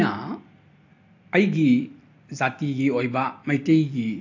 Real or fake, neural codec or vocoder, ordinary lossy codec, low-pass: real; none; AAC, 48 kbps; 7.2 kHz